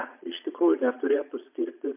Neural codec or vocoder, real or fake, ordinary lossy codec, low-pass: vocoder, 22.05 kHz, 80 mel bands, Vocos; fake; MP3, 32 kbps; 3.6 kHz